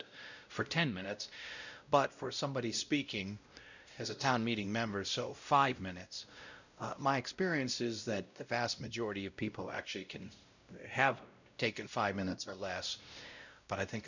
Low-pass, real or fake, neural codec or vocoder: 7.2 kHz; fake; codec, 16 kHz, 0.5 kbps, X-Codec, WavLM features, trained on Multilingual LibriSpeech